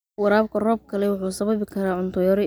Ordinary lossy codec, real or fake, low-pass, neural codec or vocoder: none; real; none; none